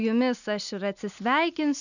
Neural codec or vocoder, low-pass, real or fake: none; 7.2 kHz; real